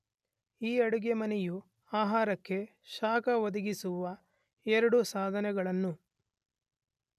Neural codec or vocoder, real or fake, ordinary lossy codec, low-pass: none; real; none; 14.4 kHz